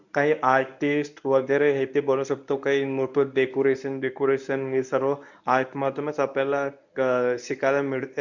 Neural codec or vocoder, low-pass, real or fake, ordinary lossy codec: codec, 24 kHz, 0.9 kbps, WavTokenizer, medium speech release version 1; 7.2 kHz; fake; none